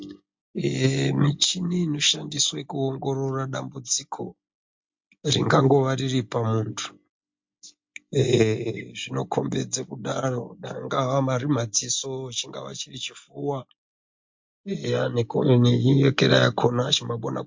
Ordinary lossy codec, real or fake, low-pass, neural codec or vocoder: MP3, 48 kbps; real; 7.2 kHz; none